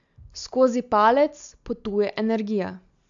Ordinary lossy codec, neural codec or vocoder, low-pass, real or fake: AAC, 64 kbps; none; 7.2 kHz; real